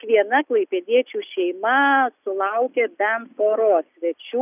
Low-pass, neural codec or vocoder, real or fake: 3.6 kHz; none; real